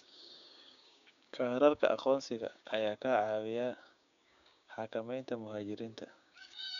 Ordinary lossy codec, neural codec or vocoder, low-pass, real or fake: none; codec, 16 kHz, 6 kbps, DAC; 7.2 kHz; fake